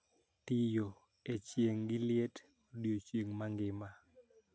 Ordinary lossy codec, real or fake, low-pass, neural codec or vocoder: none; real; none; none